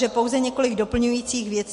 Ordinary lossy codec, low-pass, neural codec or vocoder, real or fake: MP3, 48 kbps; 10.8 kHz; none; real